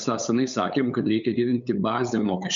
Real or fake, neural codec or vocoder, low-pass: fake; codec, 16 kHz, 8 kbps, FunCodec, trained on LibriTTS, 25 frames a second; 7.2 kHz